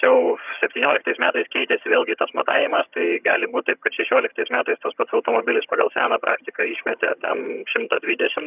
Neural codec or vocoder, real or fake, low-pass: vocoder, 22.05 kHz, 80 mel bands, HiFi-GAN; fake; 3.6 kHz